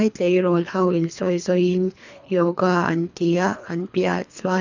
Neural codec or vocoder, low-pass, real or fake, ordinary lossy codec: codec, 24 kHz, 3 kbps, HILCodec; 7.2 kHz; fake; none